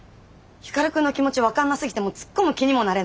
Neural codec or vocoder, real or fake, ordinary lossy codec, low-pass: none; real; none; none